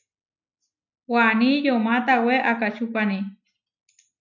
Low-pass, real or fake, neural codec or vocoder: 7.2 kHz; real; none